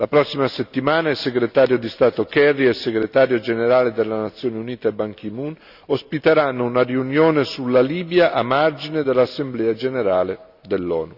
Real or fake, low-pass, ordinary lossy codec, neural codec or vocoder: real; 5.4 kHz; none; none